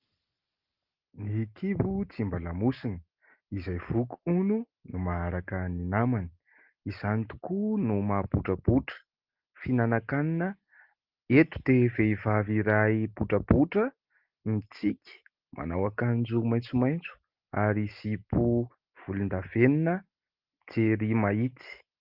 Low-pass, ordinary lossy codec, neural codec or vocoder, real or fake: 5.4 kHz; Opus, 32 kbps; none; real